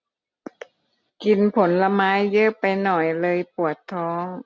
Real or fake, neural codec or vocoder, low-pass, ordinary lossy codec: real; none; none; none